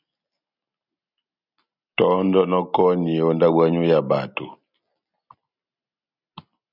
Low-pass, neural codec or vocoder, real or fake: 5.4 kHz; none; real